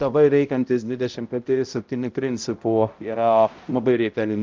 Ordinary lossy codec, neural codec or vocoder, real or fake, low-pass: Opus, 32 kbps; codec, 16 kHz, 0.5 kbps, X-Codec, HuBERT features, trained on balanced general audio; fake; 7.2 kHz